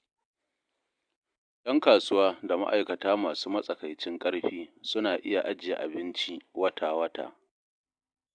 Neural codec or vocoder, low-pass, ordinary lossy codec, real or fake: none; 9.9 kHz; AAC, 64 kbps; real